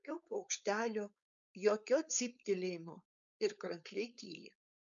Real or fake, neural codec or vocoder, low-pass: fake; codec, 16 kHz, 4.8 kbps, FACodec; 7.2 kHz